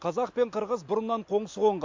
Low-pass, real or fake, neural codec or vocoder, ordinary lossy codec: 7.2 kHz; real; none; MP3, 48 kbps